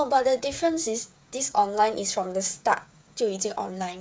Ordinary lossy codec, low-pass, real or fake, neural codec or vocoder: none; none; fake; codec, 16 kHz, 8 kbps, FreqCodec, smaller model